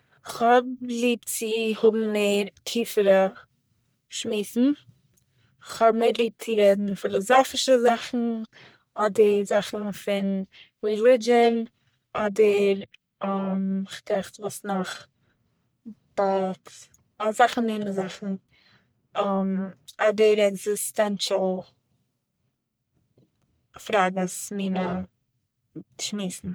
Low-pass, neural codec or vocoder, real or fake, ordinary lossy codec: none; codec, 44.1 kHz, 1.7 kbps, Pupu-Codec; fake; none